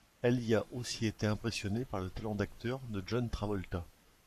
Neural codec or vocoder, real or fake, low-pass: codec, 44.1 kHz, 7.8 kbps, Pupu-Codec; fake; 14.4 kHz